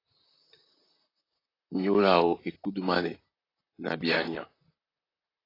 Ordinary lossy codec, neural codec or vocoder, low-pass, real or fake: AAC, 24 kbps; vocoder, 44.1 kHz, 128 mel bands, Pupu-Vocoder; 5.4 kHz; fake